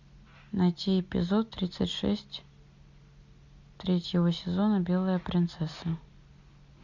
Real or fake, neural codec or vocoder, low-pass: real; none; 7.2 kHz